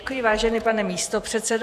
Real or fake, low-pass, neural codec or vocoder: fake; 14.4 kHz; vocoder, 48 kHz, 128 mel bands, Vocos